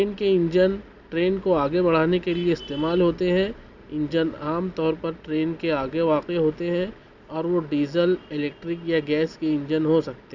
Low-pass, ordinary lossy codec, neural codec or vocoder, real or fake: 7.2 kHz; none; none; real